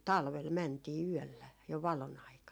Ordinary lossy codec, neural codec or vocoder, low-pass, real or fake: none; none; none; real